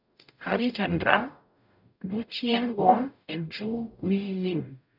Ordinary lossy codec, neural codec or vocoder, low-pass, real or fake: none; codec, 44.1 kHz, 0.9 kbps, DAC; 5.4 kHz; fake